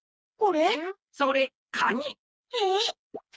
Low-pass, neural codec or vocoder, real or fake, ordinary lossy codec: none; codec, 16 kHz, 2 kbps, FreqCodec, smaller model; fake; none